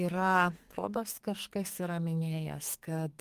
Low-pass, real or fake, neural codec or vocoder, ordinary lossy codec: 14.4 kHz; fake; codec, 44.1 kHz, 2.6 kbps, SNAC; Opus, 32 kbps